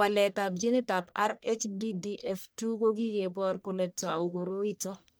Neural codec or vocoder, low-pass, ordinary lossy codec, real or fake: codec, 44.1 kHz, 1.7 kbps, Pupu-Codec; none; none; fake